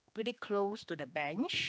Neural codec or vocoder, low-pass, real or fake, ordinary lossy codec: codec, 16 kHz, 2 kbps, X-Codec, HuBERT features, trained on general audio; none; fake; none